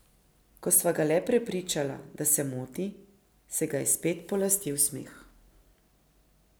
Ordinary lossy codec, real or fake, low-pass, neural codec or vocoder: none; real; none; none